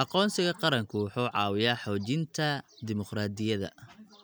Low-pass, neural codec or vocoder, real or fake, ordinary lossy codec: none; none; real; none